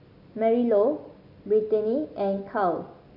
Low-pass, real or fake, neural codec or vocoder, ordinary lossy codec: 5.4 kHz; real; none; AAC, 48 kbps